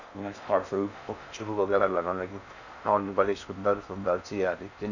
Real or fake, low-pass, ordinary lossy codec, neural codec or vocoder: fake; 7.2 kHz; none; codec, 16 kHz in and 24 kHz out, 0.6 kbps, FocalCodec, streaming, 4096 codes